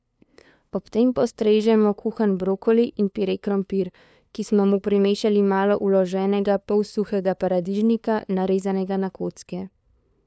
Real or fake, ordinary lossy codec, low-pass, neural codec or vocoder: fake; none; none; codec, 16 kHz, 2 kbps, FunCodec, trained on LibriTTS, 25 frames a second